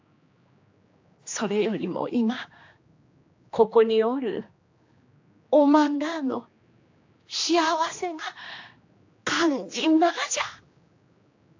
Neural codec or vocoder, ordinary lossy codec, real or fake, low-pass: codec, 16 kHz, 2 kbps, X-Codec, HuBERT features, trained on general audio; AAC, 48 kbps; fake; 7.2 kHz